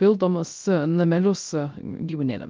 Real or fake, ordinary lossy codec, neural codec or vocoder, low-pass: fake; Opus, 24 kbps; codec, 16 kHz, 0.3 kbps, FocalCodec; 7.2 kHz